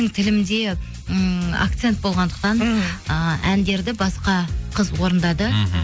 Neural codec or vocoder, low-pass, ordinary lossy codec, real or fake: none; none; none; real